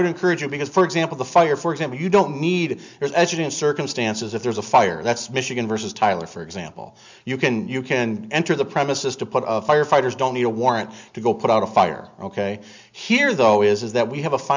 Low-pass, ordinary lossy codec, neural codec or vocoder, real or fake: 7.2 kHz; MP3, 64 kbps; none; real